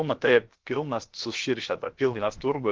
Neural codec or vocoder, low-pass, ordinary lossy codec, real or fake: codec, 16 kHz, about 1 kbps, DyCAST, with the encoder's durations; 7.2 kHz; Opus, 16 kbps; fake